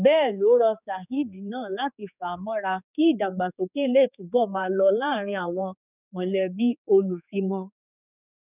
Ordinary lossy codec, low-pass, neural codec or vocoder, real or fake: none; 3.6 kHz; codec, 16 kHz, 4 kbps, X-Codec, HuBERT features, trained on general audio; fake